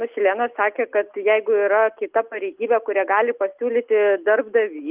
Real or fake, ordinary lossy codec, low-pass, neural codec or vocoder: real; Opus, 32 kbps; 3.6 kHz; none